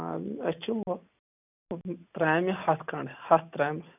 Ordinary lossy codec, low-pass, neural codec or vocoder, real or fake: none; 3.6 kHz; none; real